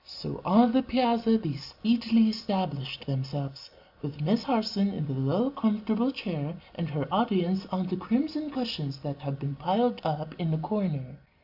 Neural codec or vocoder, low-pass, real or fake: none; 5.4 kHz; real